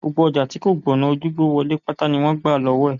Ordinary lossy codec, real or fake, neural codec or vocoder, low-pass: none; real; none; 7.2 kHz